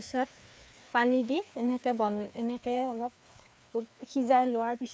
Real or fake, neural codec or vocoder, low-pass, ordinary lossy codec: fake; codec, 16 kHz, 2 kbps, FreqCodec, larger model; none; none